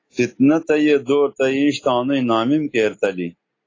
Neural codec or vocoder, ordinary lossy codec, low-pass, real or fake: none; AAC, 32 kbps; 7.2 kHz; real